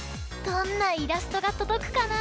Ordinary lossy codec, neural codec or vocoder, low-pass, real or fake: none; none; none; real